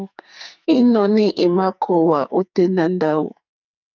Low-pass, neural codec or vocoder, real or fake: 7.2 kHz; codec, 44.1 kHz, 2.6 kbps, SNAC; fake